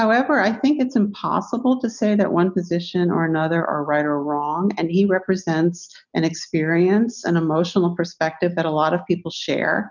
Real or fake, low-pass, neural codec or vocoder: real; 7.2 kHz; none